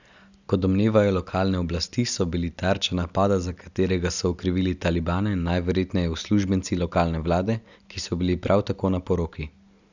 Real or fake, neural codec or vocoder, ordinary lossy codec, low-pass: real; none; none; 7.2 kHz